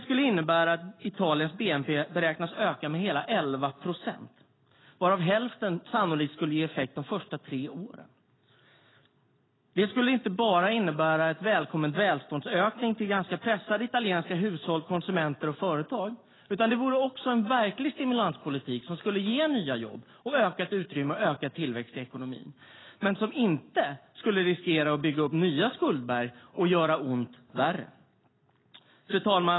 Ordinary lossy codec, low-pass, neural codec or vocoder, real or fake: AAC, 16 kbps; 7.2 kHz; none; real